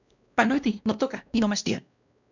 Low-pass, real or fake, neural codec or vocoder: 7.2 kHz; fake; codec, 16 kHz, 1 kbps, X-Codec, WavLM features, trained on Multilingual LibriSpeech